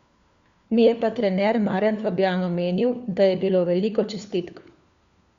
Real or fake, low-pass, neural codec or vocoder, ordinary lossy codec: fake; 7.2 kHz; codec, 16 kHz, 4 kbps, FunCodec, trained on LibriTTS, 50 frames a second; Opus, 64 kbps